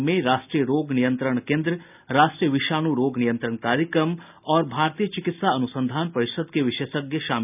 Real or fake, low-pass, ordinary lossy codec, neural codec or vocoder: real; 3.6 kHz; none; none